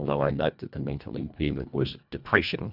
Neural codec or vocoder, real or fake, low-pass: codec, 24 kHz, 1.5 kbps, HILCodec; fake; 5.4 kHz